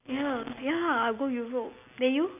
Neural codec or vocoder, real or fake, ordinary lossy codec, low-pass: none; real; none; 3.6 kHz